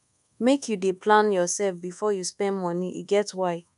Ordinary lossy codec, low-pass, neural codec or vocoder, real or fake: none; 10.8 kHz; codec, 24 kHz, 1.2 kbps, DualCodec; fake